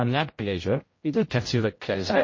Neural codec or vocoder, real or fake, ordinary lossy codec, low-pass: codec, 16 kHz, 0.5 kbps, X-Codec, HuBERT features, trained on general audio; fake; MP3, 32 kbps; 7.2 kHz